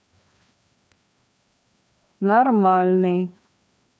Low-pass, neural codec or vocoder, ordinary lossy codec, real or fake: none; codec, 16 kHz, 2 kbps, FreqCodec, larger model; none; fake